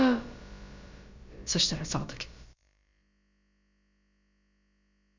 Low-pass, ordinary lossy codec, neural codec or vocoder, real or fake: 7.2 kHz; MP3, 64 kbps; codec, 16 kHz, about 1 kbps, DyCAST, with the encoder's durations; fake